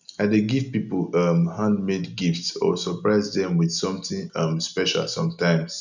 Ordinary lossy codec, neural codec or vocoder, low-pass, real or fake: none; none; 7.2 kHz; real